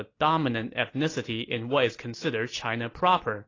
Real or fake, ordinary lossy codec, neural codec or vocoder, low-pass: real; AAC, 32 kbps; none; 7.2 kHz